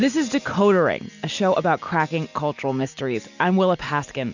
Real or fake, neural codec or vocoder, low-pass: real; none; 7.2 kHz